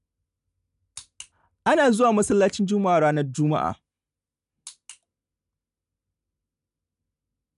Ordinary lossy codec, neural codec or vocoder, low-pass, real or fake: none; none; 10.8 kHz; real